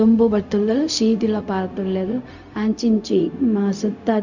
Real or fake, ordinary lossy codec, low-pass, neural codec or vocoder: fake; none; 7.2 kHz; codec, 16 kHz, 0.4 kbps, LongCat-Audio-Codec